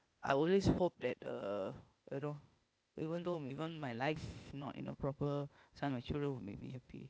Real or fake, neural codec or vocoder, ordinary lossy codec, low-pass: fake; codec, 16 kHz, 0.8 kbps, ZipCodec; none; none